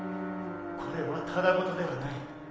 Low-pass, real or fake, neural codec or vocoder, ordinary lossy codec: none; real; none; none